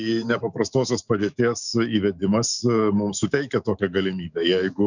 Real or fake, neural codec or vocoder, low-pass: fake; autoencoder, 48 kHz, 128 numbers a frame, DAC-VAE, trained on Japanese speech; 7.2 kHz